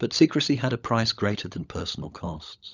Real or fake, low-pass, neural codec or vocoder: fake; 7.2 kHz; codec, 16 kHz, 16 kbps, FunCodec, trained on LibriTTS, 50 frames a second